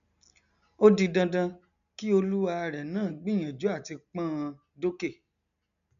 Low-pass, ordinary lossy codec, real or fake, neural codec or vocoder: 7.2 kHz; MP3, 96 kbps; real; none